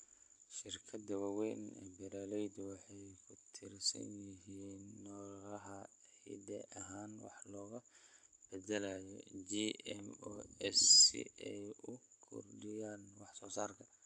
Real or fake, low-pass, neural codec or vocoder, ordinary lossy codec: real; 9.9 kHz; none; AAC, 64 kbps